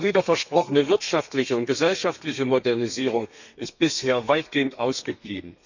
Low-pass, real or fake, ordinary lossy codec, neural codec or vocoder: 7.2 kHz; fake; none; codec, 32 kHz, 1.9 kbps, SNAC